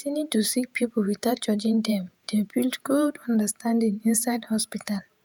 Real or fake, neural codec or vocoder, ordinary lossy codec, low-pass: fake; vocoder, 48 kHz, 128 mel bands, Vocos; none; none